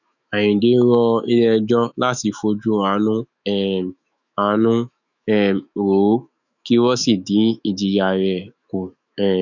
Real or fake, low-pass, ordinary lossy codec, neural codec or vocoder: fake; 7.2 kHz; none; autoencoder, 48 kHz, 128 numbers a frame, DAC-VAE, trained on Japanese speech